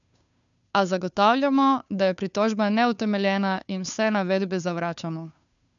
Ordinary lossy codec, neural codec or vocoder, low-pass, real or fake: none; codec, 16 kHz, 2 kbps, FunCodec, trained on Chinese and English, 25 frames a second; 7.2 kHz; fake